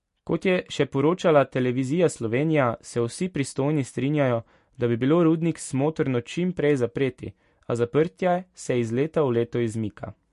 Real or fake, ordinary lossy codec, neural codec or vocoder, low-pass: real; MP3, 48 kbps; none; 14.4 kHz